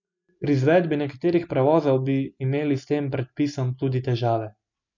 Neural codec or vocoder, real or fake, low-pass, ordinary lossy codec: none; real; 7.2 kHz; none